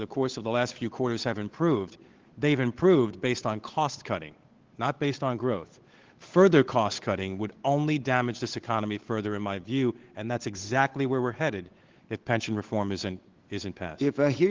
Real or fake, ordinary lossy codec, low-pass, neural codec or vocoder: fake; Opus, 16 kbps; 7.2 kHz; codec, 16 kHz, 8 kbps, FunCodec, trained on Chinese and English, 25 frames a second